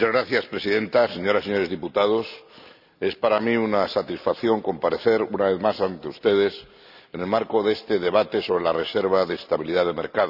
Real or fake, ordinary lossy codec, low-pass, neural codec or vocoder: real; none; 5.4 kHz; none